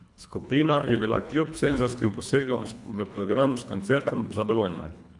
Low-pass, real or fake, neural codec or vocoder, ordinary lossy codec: 10.8 kHz; fake; codec, 24 kHz, 1.5 kbps, HILCodec; none